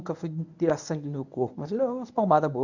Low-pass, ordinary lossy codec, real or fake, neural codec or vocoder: 7.2 kHz; none; fake; codec, 24 kHz, 0.9 kbps, WavTokenizer, medium speech release version 1